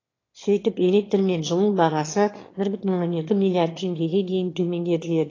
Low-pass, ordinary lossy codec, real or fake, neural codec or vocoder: 7.2 kHz; AAC, 32 kbps; fake; autoencoder, 22.05 kHz, a latent of 192 numbers a frame, VITS, trained on one speaker